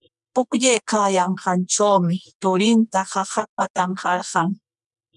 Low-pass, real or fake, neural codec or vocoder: 10.8 kHz; fake; codec, 24 kHz, 0.9 kbps, WavTokenizer, medium music audio release